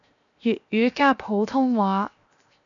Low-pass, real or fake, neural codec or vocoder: 7.2 kHz; fake; codec, 16 kHz, 0.7 kbps, FocalCodec